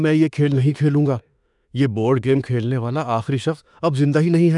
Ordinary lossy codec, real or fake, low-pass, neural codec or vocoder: none; fake; 10.8 kHz; autoencoder, 48 kHz, 32 numbers a frame, DAC-VAE, trained on Japanese speech